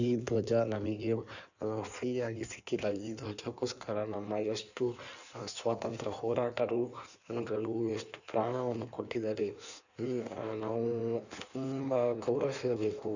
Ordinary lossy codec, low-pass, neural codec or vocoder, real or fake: none; 7.2 kHz; codec, 16 kHz in and 24 kHz out, 1.1 kbps, FireRedTTS-2 codec; fake